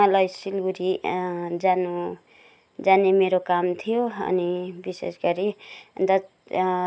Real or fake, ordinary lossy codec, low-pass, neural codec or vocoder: real; none; none; none